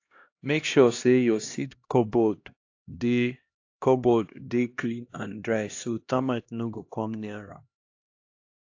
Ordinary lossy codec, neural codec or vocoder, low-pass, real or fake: AAC, 48 kbps; codec, 16 kHz, 1 kbps, X-Codec, HuBERT features, trained on LibriSpeech; 7.2 kHz; fake